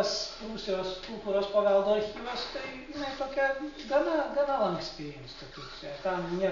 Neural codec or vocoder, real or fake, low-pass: none; real; 7.2 kHz